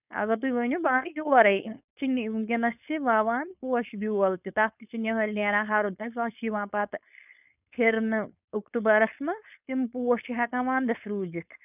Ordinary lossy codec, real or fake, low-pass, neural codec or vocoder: none; fake; 3.6 kHz; codec, 16 kHz, 4.8 kbps, FACodec